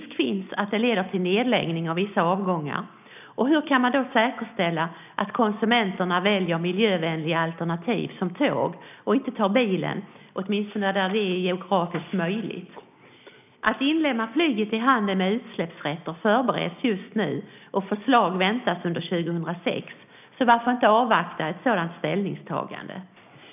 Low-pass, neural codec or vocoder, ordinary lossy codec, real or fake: 3.6 kHz; none; none; real